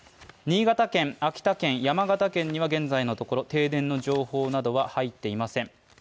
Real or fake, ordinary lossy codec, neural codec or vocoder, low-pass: real; none; none; none